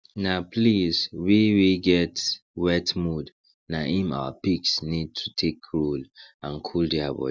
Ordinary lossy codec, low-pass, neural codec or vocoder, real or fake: none; none; none; real